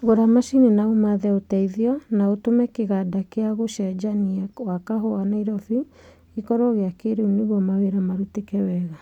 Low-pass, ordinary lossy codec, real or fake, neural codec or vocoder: 19.8 kHz; none; real; none